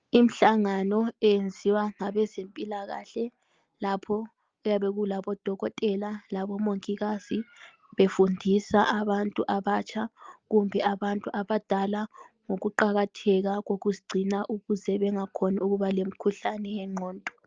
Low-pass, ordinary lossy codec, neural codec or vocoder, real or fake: 7.2 kHz; Opus, 24 kbps; none; real